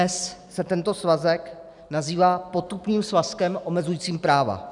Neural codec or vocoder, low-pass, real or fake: none; 10.8 kHz; real